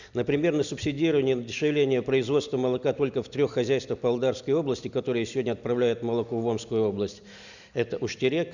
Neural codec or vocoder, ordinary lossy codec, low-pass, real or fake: none; none; 7.2 kHz; real